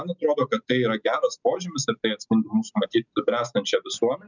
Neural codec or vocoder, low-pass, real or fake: none; 7.2 kHz; real